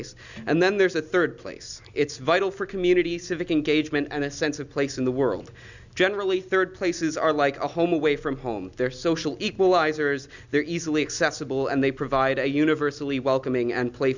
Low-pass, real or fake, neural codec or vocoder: 7.2 kHz; real; none